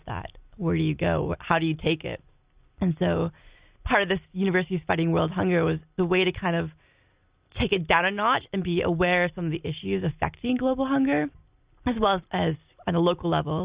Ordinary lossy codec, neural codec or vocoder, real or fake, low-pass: Opus, 64 kbps; none; real; 3.6 kHz